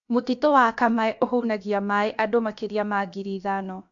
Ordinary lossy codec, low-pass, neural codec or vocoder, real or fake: AAC, 64 kbps; 7.2 kHz; codec, 16 kHz, about 1 kbps, DyCAST, with the encoder's durations; fake